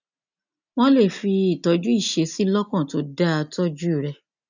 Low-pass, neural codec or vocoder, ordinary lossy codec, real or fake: 7.2 kHz; none; none; real